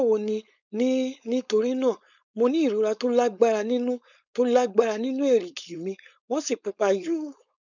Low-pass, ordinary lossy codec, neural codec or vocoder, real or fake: 7.2 kHz; none; codec, 16 kHz, 4.8 kbps, FACodec; fake